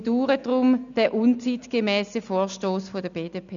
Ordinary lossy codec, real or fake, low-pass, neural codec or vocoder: none; real; 7.2 kHz; none